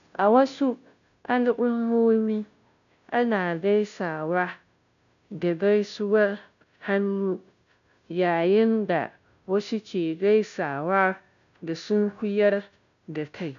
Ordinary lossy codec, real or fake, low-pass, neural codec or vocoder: none; fake; 7.2 kHz; codec, 16 kHz, 0.5 kbps, FunCodec, trained on Chinese and English, 25 frames a second